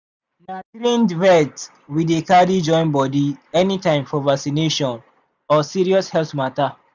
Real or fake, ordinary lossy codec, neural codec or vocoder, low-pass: real; none; none; 7.2 kHz